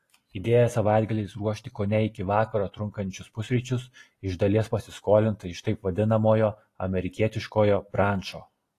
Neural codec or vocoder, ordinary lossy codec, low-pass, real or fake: none; AAC, 48 kbps; 14.4 kHz; real